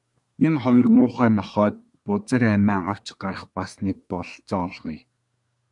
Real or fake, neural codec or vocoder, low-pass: fake; codec, 24 kHz, 1 kbps, SNAC; 10.8 kHz